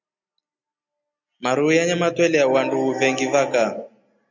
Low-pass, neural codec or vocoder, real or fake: 7.2 kHz; none; real